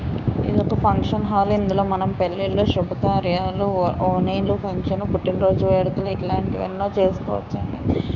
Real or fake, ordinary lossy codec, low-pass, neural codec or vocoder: fake; none; 7.2 kHz; codec, 16 kHz, 6 kbps, DAC